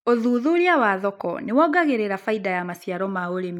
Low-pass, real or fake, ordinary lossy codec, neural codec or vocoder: 19.8 kHz; real; none; none